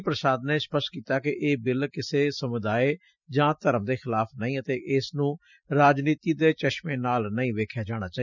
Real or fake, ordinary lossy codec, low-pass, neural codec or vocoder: real; none; 7.2 kHz; none